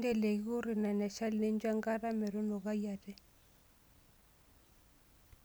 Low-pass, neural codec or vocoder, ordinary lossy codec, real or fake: none; none; none; real